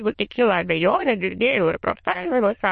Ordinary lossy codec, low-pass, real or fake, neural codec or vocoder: MP3, 32 kbps; 9.9 kHz; fake; autoencoder, 22.05 kHz, a latent of 192 numbers a frame, VITS, trained on many speakers